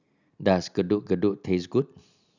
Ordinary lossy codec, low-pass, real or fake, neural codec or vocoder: none; 7.2 kHz; real; none